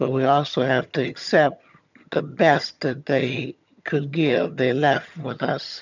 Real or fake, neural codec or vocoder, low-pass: fake; vocoder, 22.05 kHz, 80 mel bands, HiFi-GAN; 7.2 kHz